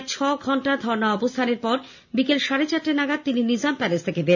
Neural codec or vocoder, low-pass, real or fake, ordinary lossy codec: none; 7.2 kHz; real; none